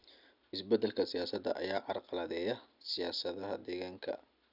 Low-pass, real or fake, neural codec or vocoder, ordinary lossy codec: 5.4 kHz; real; none; none